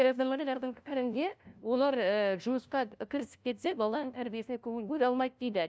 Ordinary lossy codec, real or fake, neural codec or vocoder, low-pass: none; fake; codec, 16 kHz, 0.5 kbps, FunCodec, trained on LibriTTS, 25 frames a second; none